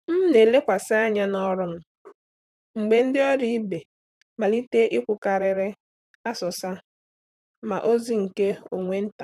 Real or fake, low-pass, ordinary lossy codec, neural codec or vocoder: fake; 14.4 kHz; none; vocoder, 44.1 kHz, 128 mel bands, Pupu-Vocoder